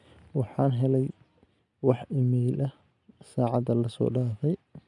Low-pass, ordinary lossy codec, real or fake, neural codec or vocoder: 10.8 kHz; none; real; none